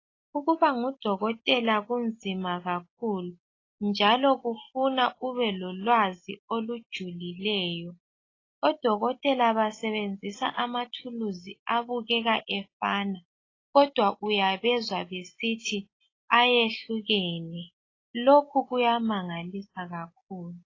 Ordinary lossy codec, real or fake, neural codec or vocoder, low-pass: AAC, 32 kbps; real; none; 7.2 kHz